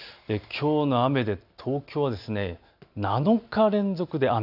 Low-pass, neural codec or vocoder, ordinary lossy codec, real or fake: 5.4 kHz; none; none; real